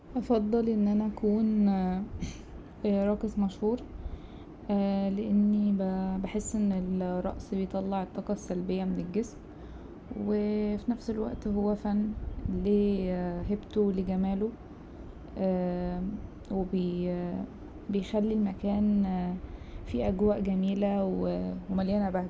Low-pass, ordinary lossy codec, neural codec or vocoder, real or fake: none; none; none; real